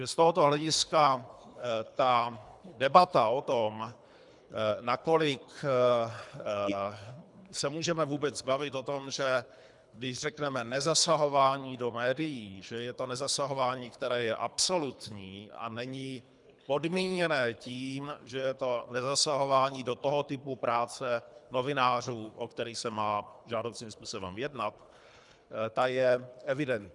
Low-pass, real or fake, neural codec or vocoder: 10.8 kHz; fake; codec, 24 kHz, 3 kbps, HILCodec